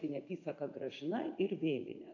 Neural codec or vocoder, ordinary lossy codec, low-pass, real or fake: vocoder, 44.1 kHz, 80 mel bands, Vocos; AAC, 48 kbps; 7.2 kHz; fake